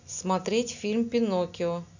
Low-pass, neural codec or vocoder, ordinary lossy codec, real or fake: 7.2 kHz; none; none; real